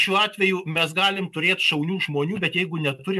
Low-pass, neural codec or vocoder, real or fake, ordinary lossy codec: 14.4 kHz; none; real; MP3, 96 kbps